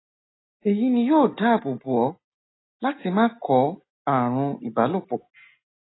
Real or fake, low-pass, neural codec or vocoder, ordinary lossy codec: real; 7.2 kHz; none; AAC, 16 kbps